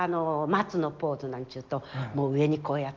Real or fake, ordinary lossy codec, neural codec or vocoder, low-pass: real; Opus, 24 kbps; none; 7.2 kHz